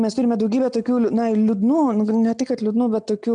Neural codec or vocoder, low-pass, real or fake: none; 9.9 kHz; real